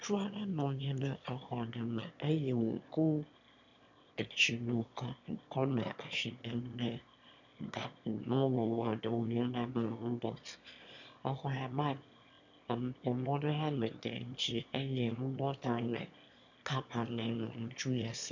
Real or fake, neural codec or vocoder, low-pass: fake; autoencoder, 22.05 kHz, a latent of 192 numbers a frame, VITS, trained on one speaker; 7.2 kHz